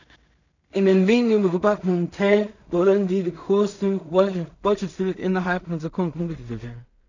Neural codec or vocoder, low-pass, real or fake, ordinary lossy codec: codec, 16 kHz in and 24 kHz out, 0.4 kbps, LongCat-Audio-Codec, two codebook decoder; 7.2 kHz; fake; none